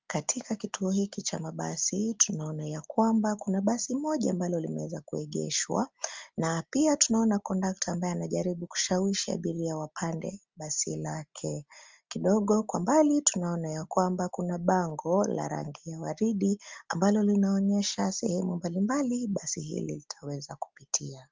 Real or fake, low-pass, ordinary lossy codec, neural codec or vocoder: real; 7.2 kHz; Opus, 32 kbps; none